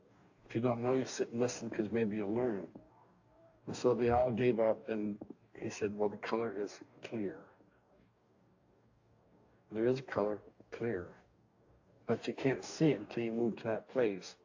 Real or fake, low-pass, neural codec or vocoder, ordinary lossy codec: fake; 7.2 kHz; codec, 44.1 kHz, 2.6 kbps, DAC; AAC, 48 kbps